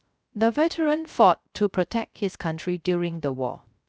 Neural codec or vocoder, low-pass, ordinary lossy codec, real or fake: codec, 16 kHz, 0.3 kbps, FocalCodec; none; none; fake